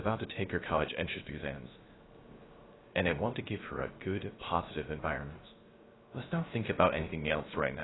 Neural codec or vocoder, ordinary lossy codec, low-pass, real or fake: codec, 16 kHz, 0.3 kbps, FocalCodec; AAC, 16 kbps; 7.2 kHz; fake